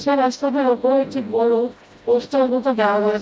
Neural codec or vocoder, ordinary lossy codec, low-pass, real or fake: codec, 16 kHz, 0.5 kbps, FreqCodec, smaller model; none; none; fake